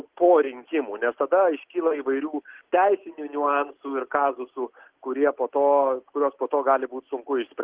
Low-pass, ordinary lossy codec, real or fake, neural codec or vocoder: 3.6 kHz; Opus, 16 kbps; real; none